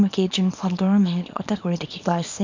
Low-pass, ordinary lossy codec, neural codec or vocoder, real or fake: 7.2 kHz; MP3, 64 kbps; codec, 24 kHz, 0.9 kbps, WavTokenizer, small release; fake